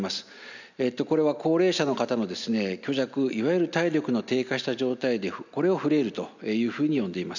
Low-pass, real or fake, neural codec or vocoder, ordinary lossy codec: 7.2 kHz; real; none; none